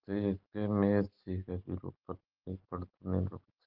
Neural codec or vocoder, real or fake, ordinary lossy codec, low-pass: none; real; Opus, 16 kbps; 5.4 kHz